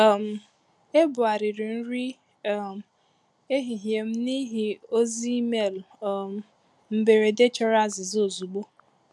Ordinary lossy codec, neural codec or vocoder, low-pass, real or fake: none; none; none; real